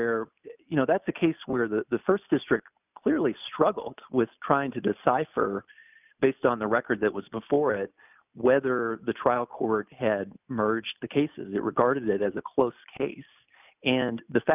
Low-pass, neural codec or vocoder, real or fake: 3.6 kHz; none; real